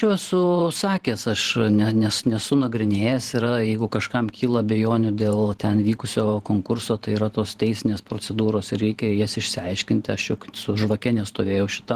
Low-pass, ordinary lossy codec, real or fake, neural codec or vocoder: 14.4 kHz; Opus, 16 kbps; fake; vocoder, 44.1 kHz, 128 mel bands every 512 samples, BigVGAN v2